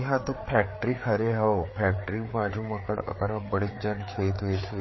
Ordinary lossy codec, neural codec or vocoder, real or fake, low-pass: MP3, 24 kbps; codec, 16 kHz, 4 kbps, FreqCodec, larger model; fake; 7.2 kHz